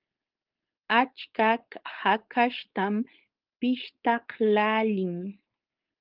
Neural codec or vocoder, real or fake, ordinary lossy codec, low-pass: codec, 16 kHz, 4.8 kbps, FACodec; fake; Opus, 24 kbps; 5.4 kHz